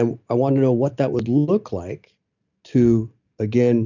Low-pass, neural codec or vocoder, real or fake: 7.2 kHz; none; real